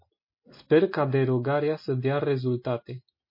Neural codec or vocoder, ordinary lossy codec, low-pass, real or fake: none; MP3, 24 kbps; 5.4 kHz; real